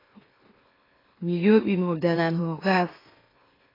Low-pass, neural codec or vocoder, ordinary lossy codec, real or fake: 5.4 kHz; autoencoder, 44.1 kHz, a latent of 192 numbers a frame, MeloTTS; AAC, 24 kbps; fake